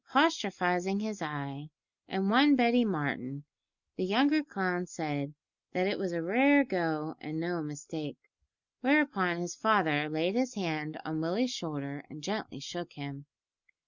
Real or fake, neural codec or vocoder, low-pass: real; none; 7.2 kHz